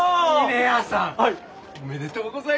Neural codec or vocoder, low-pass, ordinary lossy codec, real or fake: none; none; none; real